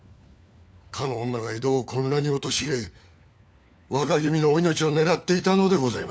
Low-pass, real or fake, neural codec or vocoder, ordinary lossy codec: none; fake; codec, 16 kHz, 4 kbps, FunCodec, trained on LibriTTS, 50 frames a second; none